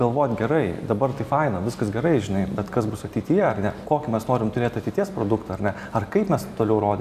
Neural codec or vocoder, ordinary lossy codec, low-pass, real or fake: vocoder, 44.1 kHz, 128 mel bands every 256 samples, BigVGAN v2; AAC, 96 kbps; 14.4 kHz; fake